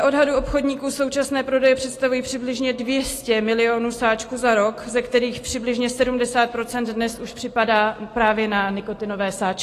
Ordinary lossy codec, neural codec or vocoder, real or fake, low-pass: AAC, 48 kbps; none; real; 14.4 kHz